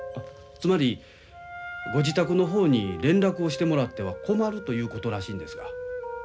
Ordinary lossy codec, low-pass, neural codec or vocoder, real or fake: none; none; none; real